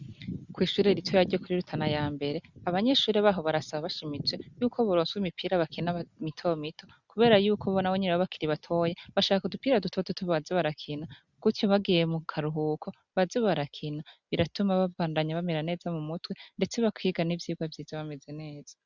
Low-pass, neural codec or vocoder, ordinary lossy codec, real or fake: 7.2 kHz; none; Opus, 64 kbps; real